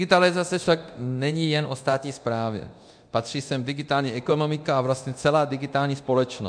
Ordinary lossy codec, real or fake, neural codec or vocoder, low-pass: MP3, 64 kbps; fake; codec, 24 kHz, 0.9 kbps, DualCodec; 9.9 kHz